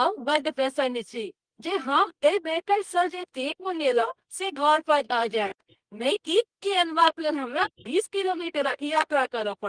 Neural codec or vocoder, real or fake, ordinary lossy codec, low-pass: codec, 24 kHz, 0.9 kbps, WavTokenizer, medium music audio release; fake; Opus, 24 kbps; 9.9 kHz